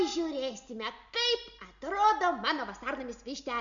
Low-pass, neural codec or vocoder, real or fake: 7.2 kHz; none; real